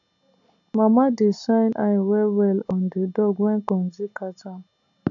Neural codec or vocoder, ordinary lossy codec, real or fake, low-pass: none; AAC, 64 kbps; real; 7.2 kHz